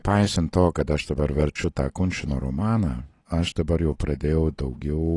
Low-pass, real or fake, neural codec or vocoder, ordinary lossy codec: 10.8 kHz; real; none; AAC, 32 kbps